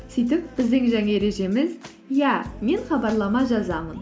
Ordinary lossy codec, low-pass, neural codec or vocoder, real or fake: none; none; none; real